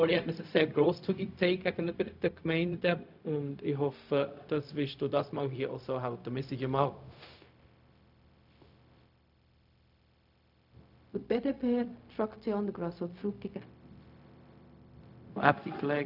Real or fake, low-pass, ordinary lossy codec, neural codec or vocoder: fake; 5.4 kHz; none; codec, 16 kHz, 0.4 kbps, LongCat-Audio-Codec